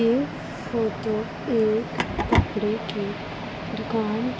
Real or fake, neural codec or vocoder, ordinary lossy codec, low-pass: real; none; none; none